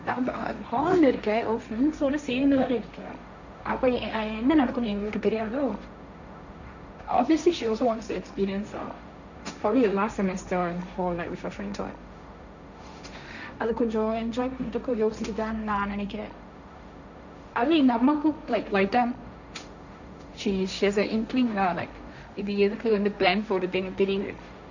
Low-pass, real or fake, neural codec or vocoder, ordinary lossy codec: 7.2 kHz; fake; codec, 16 kHz, 1.1 kbps, Voila-Tokenizer; none